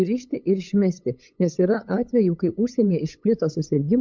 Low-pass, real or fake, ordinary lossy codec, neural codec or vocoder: 7.2 kHz; fake; MP3, 64 kbps; codec, 16 kHz, 8 kbps, FunCodec, trained on LibriTTS, 25 frames a second